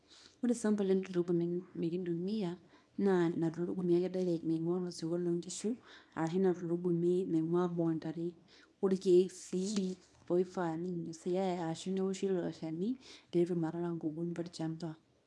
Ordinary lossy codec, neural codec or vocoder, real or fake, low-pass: none; codec, 24 kHz, 0.9 kbps, WavTokenizer, small release; fake; none